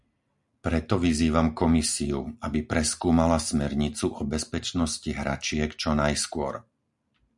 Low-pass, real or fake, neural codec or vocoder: 10.8 kHz; real; none